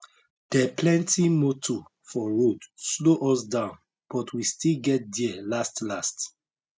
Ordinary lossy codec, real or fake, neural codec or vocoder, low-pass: none; real; none; none